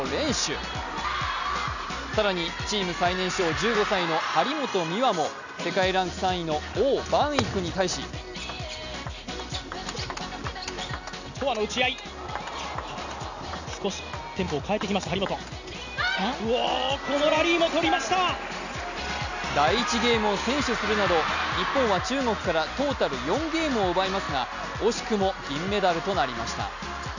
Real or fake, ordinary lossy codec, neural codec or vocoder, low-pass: real; none; none; 7.2 kHz